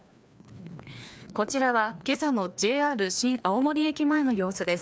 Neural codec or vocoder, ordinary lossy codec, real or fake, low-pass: codec, 16 kHz, 2 kbps, FreqCodec, larger model; none; fake; none